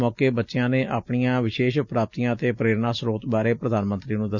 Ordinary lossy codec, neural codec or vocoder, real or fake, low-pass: none; none; real; 7.2 kHz